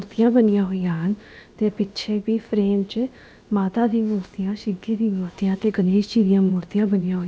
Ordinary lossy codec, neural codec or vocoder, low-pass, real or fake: none; codec, 16 kHz, about 1 kbps, DyCAST, with the encoder's durations; none; fake